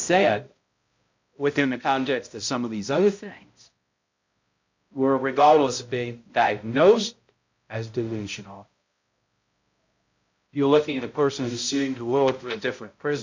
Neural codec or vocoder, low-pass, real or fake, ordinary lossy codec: codec, 16 kHz, 0.5 kbps, X-Codec, HuBERT features, trained on balanced general audio; 7.2 kHz; fake; MP3, 48 kbps